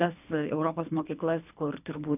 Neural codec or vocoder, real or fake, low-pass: codec, 24 kHz, 3 kbps, HILCodec; fake; 3.6 kHz